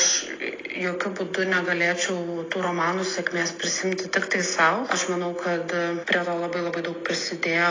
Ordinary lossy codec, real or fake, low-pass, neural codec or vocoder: AAC, 32 kbps; real; 7.2 kHz; none